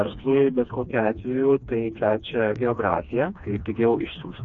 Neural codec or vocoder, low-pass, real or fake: codec, 16 kHz, 2 kbps, FreqCodec, smaller model; 7.2 kHz; fake